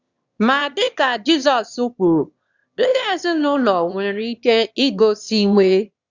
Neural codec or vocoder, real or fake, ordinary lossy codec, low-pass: autoencoder, 22.05 kHz, a latent of 192 numbers a frame, VITS, trained on one speaker; fake; Opus, 64 kbps; 7.2 kHz